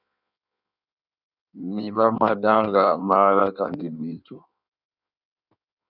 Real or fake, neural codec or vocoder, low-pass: fake; codec, 16 kHz in and 24 kHz out, 1.1 kbps, FireRedTTS-2 codec; 5.4 kHz